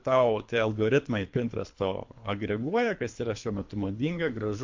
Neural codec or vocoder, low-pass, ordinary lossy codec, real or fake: codec, 24 kHz, 3 kbps, HILCodec; 7.2 kHz; MP3, 48 kbps; fake